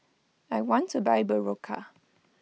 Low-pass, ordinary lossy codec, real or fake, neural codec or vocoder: none; none; real; none